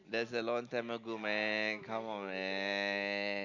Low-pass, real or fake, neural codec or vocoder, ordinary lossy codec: 7.2 kHz; real; none; none